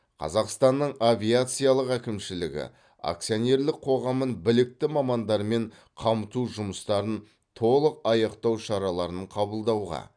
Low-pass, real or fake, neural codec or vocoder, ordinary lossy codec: 9.9 kHz; real; none; none